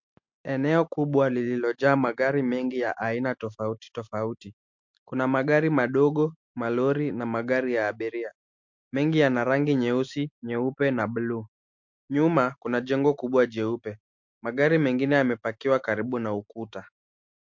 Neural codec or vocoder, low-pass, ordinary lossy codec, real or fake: none; 7.2 kHz; MP3, 48 kbps; real